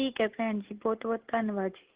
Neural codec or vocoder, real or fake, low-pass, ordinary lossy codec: none; real; 3.6 kHz; Opus, 16 kbps